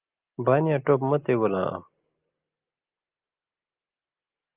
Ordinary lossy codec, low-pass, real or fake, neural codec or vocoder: Opus, 32 kbps; 3.6 kHz; real; none